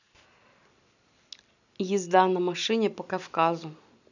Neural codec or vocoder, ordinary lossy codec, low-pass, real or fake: none; none; 7.2 kHz; real